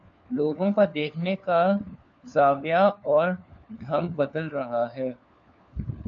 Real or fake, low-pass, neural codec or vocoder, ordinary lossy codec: fake; 7.2 kHz; codec, 16 kHz, 4 kbps, FunCodec, trained on LibriTTS, 50 frames a second; MP3, 96 kbps